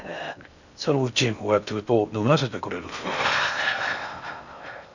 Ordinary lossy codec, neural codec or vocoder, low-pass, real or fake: none; codec, 16 kHz in and 24 kHz out, 0.6 kbps, FocalCodec, streaming, 2048 codes; 7.2 kHz; fake